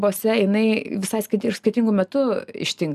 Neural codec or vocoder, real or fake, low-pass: none; real; 14.4 kHz